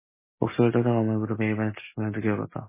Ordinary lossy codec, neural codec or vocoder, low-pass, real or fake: MP3, 16 kbps; none; 3.6 kHz; real